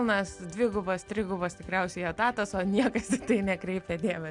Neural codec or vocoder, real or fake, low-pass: none; real; 10.8 kHz